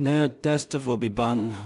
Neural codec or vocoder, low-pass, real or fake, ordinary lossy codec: codec, 16 kHz in and 24 kHz out, 0.4 kbps, LongCat-Audio-Codec, two codebook decoder; 10.8 kHz; fake; none